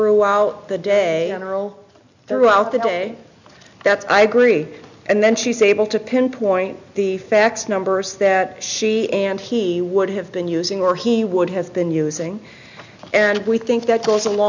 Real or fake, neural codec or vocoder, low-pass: real; none; 7.2 kHz